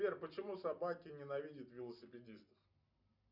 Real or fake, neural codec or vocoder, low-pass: real; none; 5.4 kHz